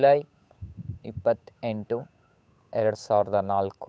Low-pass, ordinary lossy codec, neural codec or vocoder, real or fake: none; none; codec, 16 kHz, 8 kbps, FunCodec, trained on Chinese and English, 25 frames a second; fake